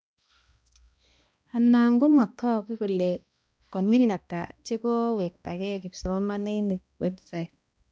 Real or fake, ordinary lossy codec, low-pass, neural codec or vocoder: fake; none; none; codec, 16 kHz, 1 kbps, X-Codec, HuBERT features, trained on balanced general audio